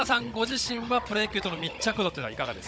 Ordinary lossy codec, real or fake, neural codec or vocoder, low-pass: none; fake; codec, 16 kHz, 16 kbps, FunCodec, trained on Chinese and English, 50 frames a second; none